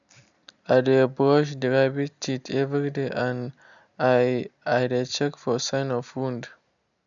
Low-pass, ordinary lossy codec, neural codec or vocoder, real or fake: 7.2 kHz; none; none; real